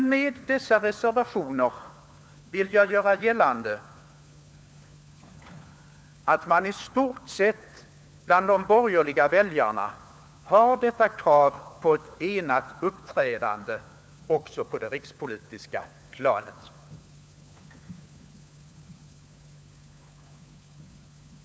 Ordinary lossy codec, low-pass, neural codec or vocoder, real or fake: none; none; codec, 16 kHz, 4 kbps, FunCodec, trained on LibriTTS, 50 frames a second; fake